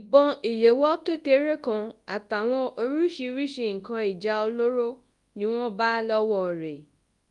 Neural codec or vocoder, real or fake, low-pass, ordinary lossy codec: codec, 24 kHz, 0.9 kbps, WavTokenizer, large speech release; fake; 10.8 kHz; Opus, 32 kbps